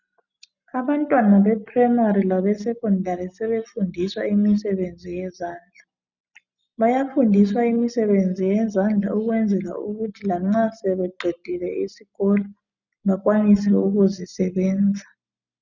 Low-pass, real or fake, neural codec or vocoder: 7.2 kHz; real; none